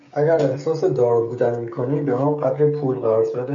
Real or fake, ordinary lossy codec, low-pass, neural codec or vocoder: fake; MP3, 48 kbps; 7.2 kHz; codec, 16 kHz, 16 kbps, FreqCodec, smaller model